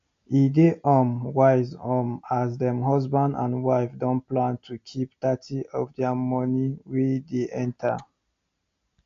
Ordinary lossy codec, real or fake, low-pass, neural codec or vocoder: AAC, 48 kbps; real; 7.2 kHz; none